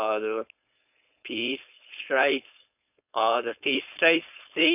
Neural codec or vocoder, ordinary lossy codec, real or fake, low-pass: codec, 16 kHz, 4.8 kbps, FACodec; none; fake; 3.6 kHz